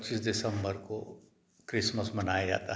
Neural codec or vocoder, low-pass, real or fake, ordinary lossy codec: none; none; real; none